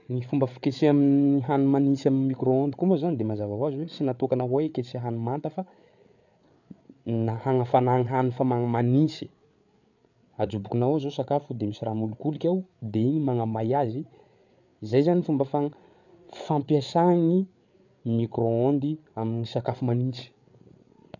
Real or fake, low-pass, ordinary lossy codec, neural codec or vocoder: fake; 7.2 kHz; none; codec, 16 kHz, 8 kbps, FreqCodec, larger model